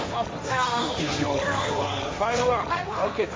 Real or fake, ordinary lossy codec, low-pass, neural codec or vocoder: fake; none; none; codec, 16 kHz, 1.1 kbps, Voila-Tokenizer